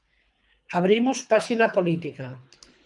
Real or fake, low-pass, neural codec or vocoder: fake; 10.8 kHz; codec, 24 kHz, 3 kbps, HILCodec